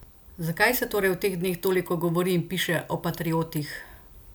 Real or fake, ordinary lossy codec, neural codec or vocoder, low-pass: real; none; none; none